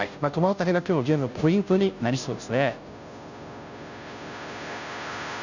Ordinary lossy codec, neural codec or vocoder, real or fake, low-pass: none; codec, 16 kHz, 0.5 kbps, FunCodec, trained on Chinese and English, 25 frames a second; fake; 7.2 kHz